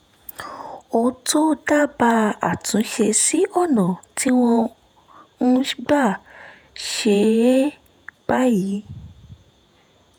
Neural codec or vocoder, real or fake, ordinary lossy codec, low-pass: vocoder, 48 kHz, 128 mel bands, Vocos; fake; none; none